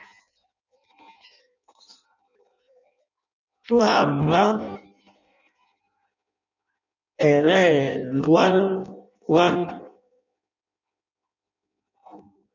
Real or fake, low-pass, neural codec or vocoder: fake; 7.2 kHz; codec, 16 kHz in and 24 kHz out, 0.6 kbps, FireRedTTS-2 codec